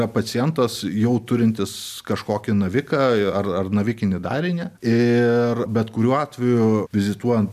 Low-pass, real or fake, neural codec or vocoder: 14.4 kHz; real; none